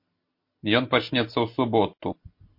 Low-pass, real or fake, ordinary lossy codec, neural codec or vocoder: 5.4 kHz; real; MP3, 32 kbps; none